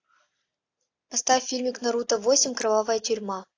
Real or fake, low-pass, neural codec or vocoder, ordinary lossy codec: real; 7.2 kHz; none; AAC, 48 kbps